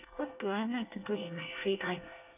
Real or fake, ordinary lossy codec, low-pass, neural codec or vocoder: fake; none; 3.6 kHz; codec, 24 kHz, 1 kbps, SNAC